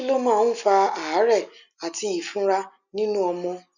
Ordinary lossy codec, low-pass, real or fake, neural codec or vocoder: none; 7.2 kHz; real; none